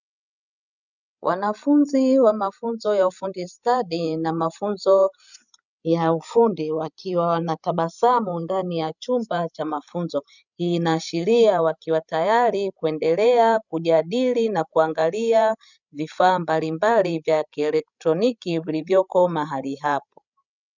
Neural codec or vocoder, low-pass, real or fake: codec, 16 kHz, 16 kbps, FreqCodec, larger model; 7.2 kHz; fake